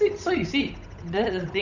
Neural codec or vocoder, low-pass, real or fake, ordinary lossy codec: codec, 16 kHz, 8 kbps, FunCodec, trained on Chinese and English, 25 frames a second; 7.2 kHz; fake; none